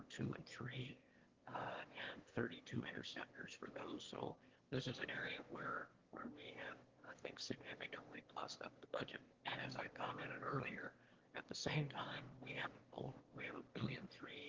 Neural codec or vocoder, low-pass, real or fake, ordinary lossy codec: autoencoder, 22.05 kHz, a latent of 192 numbers a frame, VITS, trained on one speaker; 7.2 kHz; fake; Opus, 16 kbps